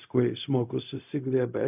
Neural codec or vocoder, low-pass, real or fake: codec, 16 kHz, 0.4 kbps, LongCat-Audio-Codec; 3.6 kHz; fake